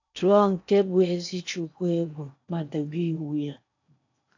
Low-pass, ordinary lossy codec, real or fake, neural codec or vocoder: 7.2 kHz; AAC, 48 kbps; fake; codec, 16 kHz in and 24 kHz out, 0.8 kbps, FocalCodec, streaming, 65536 codes